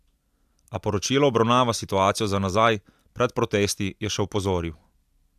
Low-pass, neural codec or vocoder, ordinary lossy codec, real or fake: 14.4 kHz; none; AAC, 96 kbps; real